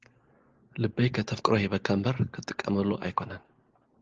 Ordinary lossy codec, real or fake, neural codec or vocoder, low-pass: Opus, 16 kbps; real; none; 7.2 kHz